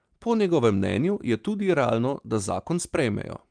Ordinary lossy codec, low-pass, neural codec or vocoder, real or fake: Opus, 32 kbps; 9.9 kHz; none; real